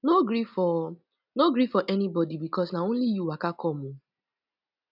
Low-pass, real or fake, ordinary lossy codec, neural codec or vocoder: 5.4 kHz; real; none; none